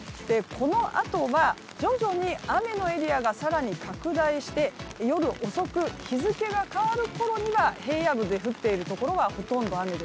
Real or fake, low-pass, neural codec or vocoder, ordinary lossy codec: real; none; none; none